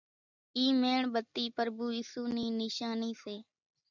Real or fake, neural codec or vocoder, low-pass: real; none; 7.2 kHz